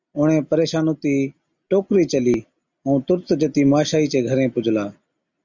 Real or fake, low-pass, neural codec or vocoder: real; 7.2 kHz; none